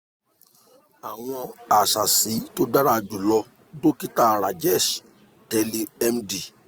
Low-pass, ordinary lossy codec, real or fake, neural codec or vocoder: none; none; real; none